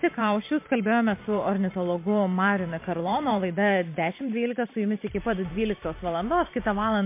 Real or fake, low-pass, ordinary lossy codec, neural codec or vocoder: fake; 3.6 kHz; MP3, 24 kbps; vocoder, 44.1 kHz, 128 mel bands every 512 samples, BigVGAN v2